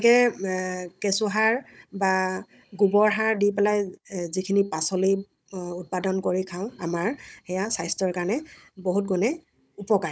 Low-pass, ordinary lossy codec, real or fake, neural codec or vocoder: none; none; fake; codec, 16 kHz, 16 kbps, FunCodec, trained on Chinese and English, 50 frames a second